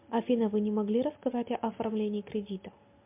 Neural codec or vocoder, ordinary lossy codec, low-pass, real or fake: none; MP3, 32 kbps; 3.6 kHz; real